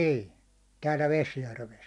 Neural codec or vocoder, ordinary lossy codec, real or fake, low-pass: none; none; real; none